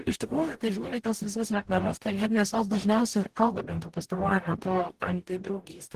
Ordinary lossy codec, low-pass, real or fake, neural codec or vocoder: Opus, 16 kbps; 14.4 kHz; fake; codec, 44.1 kHz, 0.9 kbps, DAC